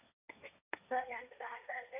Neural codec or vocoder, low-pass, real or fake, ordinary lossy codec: codec, 16 kHz, 1.1 kbps, Voila-Tokenizer; 3.6 kHz; fake; none